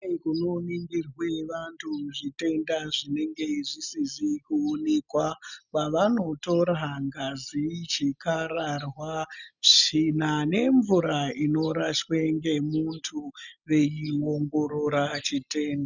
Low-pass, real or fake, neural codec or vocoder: 7.2 kHz; real; none